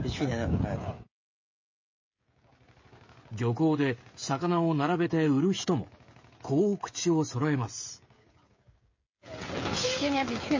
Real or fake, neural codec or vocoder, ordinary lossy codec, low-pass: fake; codec, 16 kHz, 16 kbps, FreqCodec, smaller model; MP3, 32 kbps; 7.2 kHz